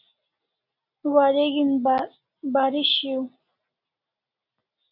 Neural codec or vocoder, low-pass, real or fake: none; 5.4 kHz; real